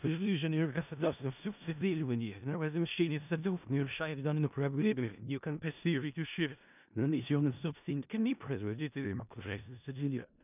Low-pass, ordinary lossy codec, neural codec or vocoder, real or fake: 3.6 kHz; none; codec, 16 kHz in and 24 kHz out, 0.4 kbps, LongCat-Audio-Codec, four codebook decoder; fake